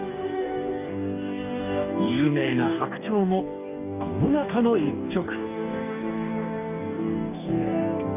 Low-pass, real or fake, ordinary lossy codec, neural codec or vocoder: 3.6 kHz; fake; none; codec, 44.1 kHz, 2.6 kbps, DAC